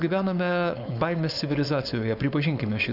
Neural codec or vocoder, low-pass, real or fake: codec, 16 kHz, 4.8 kbps, FACodec; 5.4 kHz; fake